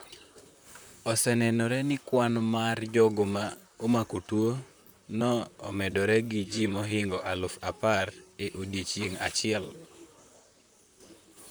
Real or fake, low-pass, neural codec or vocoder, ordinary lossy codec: fake; none; vocoder, 44.1 kHz, 128 mel bands, Pupu-Vocoder; none